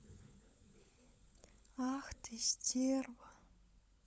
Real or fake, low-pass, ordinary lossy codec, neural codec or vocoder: fake; none; none; codec, 16 kHz, 16 kbps, FunCodec, trained on LibriTTS, 50 frames a second